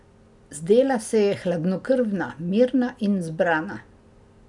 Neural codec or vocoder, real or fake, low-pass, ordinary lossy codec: none; real; 10.8 kHz; none